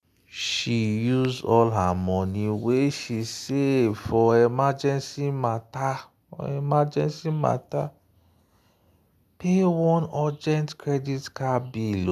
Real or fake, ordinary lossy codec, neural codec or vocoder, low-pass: real; none; none; 14.4 kHz